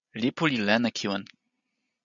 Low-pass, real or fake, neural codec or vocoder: 7.2 kHz; real; none